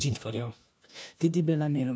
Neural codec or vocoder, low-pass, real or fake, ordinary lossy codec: codec, 16 kHz, 1 kbps, FunCodec, trained on LibriTTS, 50 frames a second; none; fake; none